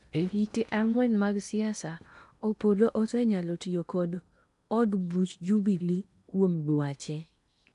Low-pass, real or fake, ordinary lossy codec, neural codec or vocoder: 10.8 kHz; fake; none; codec, 16 kHz in and 24 kHz out, 0.8 kbps, FocalCodec, streaming, 65536 codes